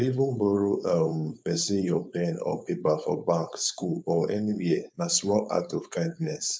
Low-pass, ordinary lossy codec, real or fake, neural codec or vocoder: none; none; fake; codec, 16 kHz, 4.8 kbps, FACodec